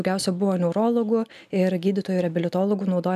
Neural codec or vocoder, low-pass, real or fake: none; 14.4 kHz; real